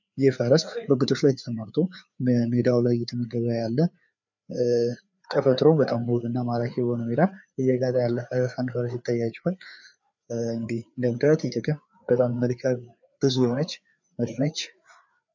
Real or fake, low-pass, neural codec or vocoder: fake; 7.2 kHz; codec, 16 kHz, 4 kbps, FreqCodec, larger model